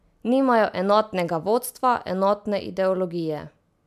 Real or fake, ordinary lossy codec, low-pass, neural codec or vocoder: real; MP3, 96 kbps; 14.4 kHz; none